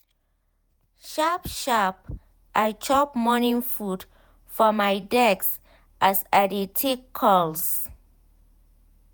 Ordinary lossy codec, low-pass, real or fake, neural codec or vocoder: none; none; fake; vocoder, 48 kHz, 128 mel bands, Vocos